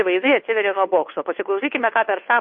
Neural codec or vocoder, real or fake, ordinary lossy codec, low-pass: codec, 16 kHz, 2 kbps, FunCodec, trained on Chinese and English, 25 frames a second; fake; MP3, 32 kbps; 7.2 kHz